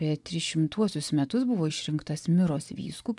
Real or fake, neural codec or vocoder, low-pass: fake; vocoder, 24 kHz, 100 mel bands, Vocos; 10.8 kHz